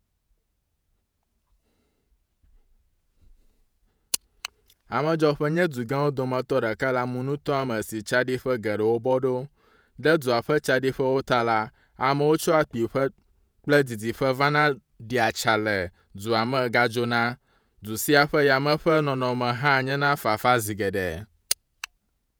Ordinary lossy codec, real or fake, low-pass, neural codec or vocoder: none; fake; none; vocoder, 48 kHz, 128 mel bands, Vocos